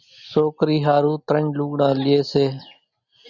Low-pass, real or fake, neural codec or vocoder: 7.2 kHz; real; none